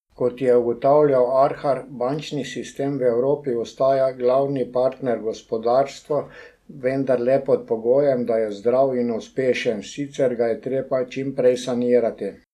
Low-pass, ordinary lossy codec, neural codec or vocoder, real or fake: 14.4 kHz; none; none; real